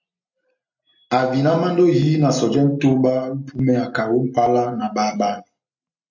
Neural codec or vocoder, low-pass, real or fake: none; 7.2 kHz; real